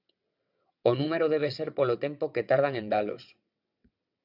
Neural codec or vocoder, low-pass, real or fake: vocoder, 22.05 kHz, 80 mel bands, WaveNeXt; 5.4 kHz; fake